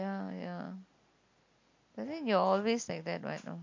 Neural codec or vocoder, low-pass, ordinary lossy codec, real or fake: none; 7.2 kHz; MP3, 48 kbps; real